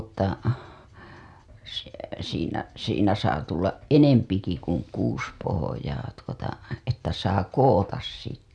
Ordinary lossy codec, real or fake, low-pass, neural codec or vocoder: none; real; none; none